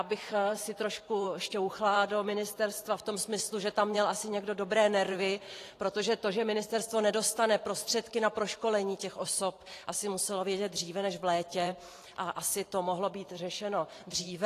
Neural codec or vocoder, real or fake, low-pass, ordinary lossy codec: vocoder, 44.1 kHz, 128 mel bands every 512 samples, BigVGAN v2; fake; 14.4 kHz; AAC, 48 kbps